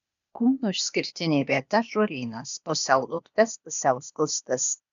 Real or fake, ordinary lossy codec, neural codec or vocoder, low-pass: fake; MP3, 96 kbps; codec, 16 kHz, 0.8 kbps, ZipCodec; 7.2 kHz